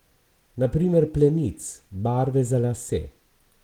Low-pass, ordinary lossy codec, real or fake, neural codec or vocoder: 19.8 kHz; Opus, 16 kbps; real; none